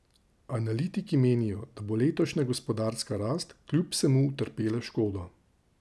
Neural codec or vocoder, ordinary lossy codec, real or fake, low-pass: none; none; real; none